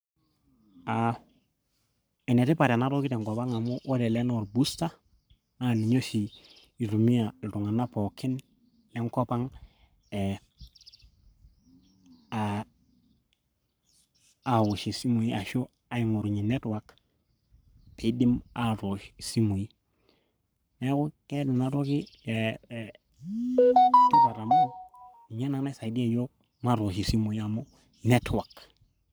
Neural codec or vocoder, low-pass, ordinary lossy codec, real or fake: codec, 44.1 kHz, 7.8 kbps, Pupu-Codec; none; none; fake